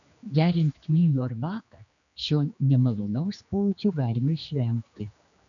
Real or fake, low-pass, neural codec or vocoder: fake; 7.2 kHz; codec, 16 kHz, 2 kbps, X-Codec, HuBERT features, trained on general audio